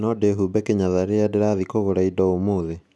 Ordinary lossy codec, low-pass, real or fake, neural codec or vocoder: none; none; real; none